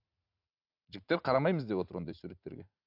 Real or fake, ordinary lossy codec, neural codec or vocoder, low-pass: fake; AAC, 48 kbps; vocoder, 44.1 kHz, 80 mel bands, Vocos; 5.4 kHz